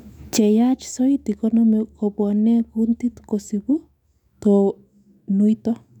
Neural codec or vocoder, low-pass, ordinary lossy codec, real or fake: autoencoder, 48 kHz, 128 numbers a frame, DAC-VAE, trained on Japanese speech; 19.8 kHz; none; fake